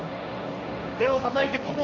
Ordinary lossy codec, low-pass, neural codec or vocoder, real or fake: none; 7.2 kHz; codec, 16 kHz, 1.1 kbps, Voila-Tokenizer; fake